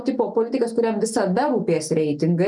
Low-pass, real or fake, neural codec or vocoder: 10.8 kHz; real; none